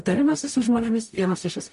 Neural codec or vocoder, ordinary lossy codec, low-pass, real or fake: codec, 44.1 kHz, 0.9 kbps, DAC; MP3, 48 kbps; 14.4 kHz; fake